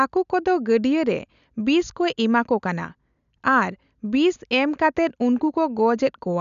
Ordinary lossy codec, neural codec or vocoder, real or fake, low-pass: none; none; real; 7.2 kHz